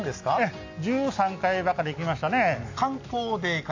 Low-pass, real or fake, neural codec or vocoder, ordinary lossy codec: 7.2 kHz; real; none; AAC, 48 kbps